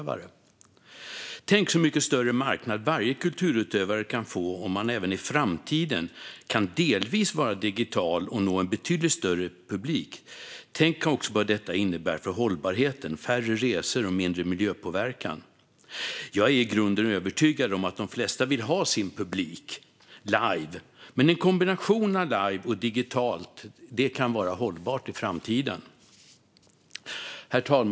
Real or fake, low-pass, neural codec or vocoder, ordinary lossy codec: real; none; none; none